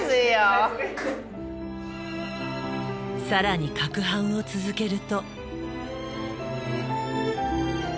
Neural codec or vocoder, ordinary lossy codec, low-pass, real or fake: none; none; none; real